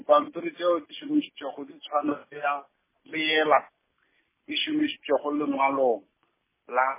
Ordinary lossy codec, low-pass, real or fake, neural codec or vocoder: MP3, 16 kbps; 3.6 kHz; real; none